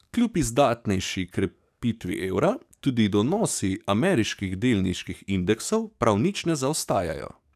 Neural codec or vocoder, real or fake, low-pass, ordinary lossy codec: codec, 44.1 kHz, 7.8 kbps, DAC; fake; 14.4 kHz; none